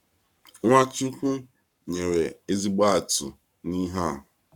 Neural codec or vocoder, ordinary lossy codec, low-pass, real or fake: codec, 44.1 kHz, 7.8 kbps, Pupu-Codec; none; 19.8 kHz; fake